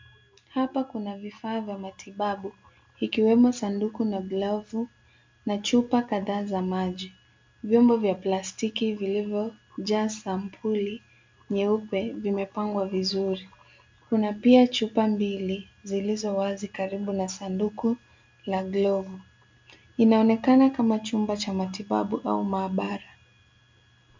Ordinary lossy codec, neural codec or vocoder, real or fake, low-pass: MP3, 64 kbps; none; real; 7.2 kHz